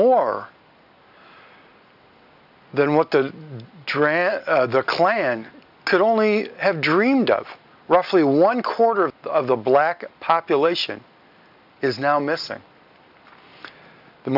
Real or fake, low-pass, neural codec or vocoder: real; 5.4 kHz; none